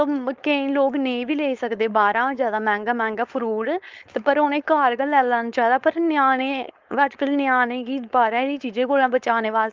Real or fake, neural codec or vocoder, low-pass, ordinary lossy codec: fake; codec, 16 kHz, 4.8 kbps, FACodec; 7.2 kHz; Opus, 32 kbps